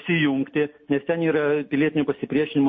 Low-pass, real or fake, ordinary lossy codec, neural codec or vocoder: 7.2 kHz; real; MP3, 32 kbps; none